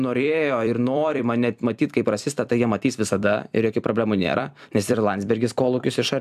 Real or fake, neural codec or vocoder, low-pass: fake; vocoder, 44.1 kHz, 128 mel bands every 256 samples, BigVGAN v2; 14.4 kHz